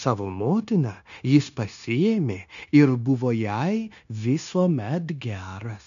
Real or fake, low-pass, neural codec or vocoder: fake; 7.2 kHz; codec, 16 kHz, 0.9 kbps, LongCat-Audio-Codec